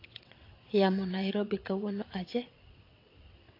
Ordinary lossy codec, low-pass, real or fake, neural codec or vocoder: AAC, 32 kbps; 5.4 kHz; fake; vocoder, 22.05 kHz, 80 mel bands, WaveNeXt